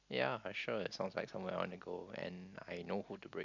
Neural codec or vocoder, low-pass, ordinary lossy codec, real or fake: none; 7.2 kHz; none; real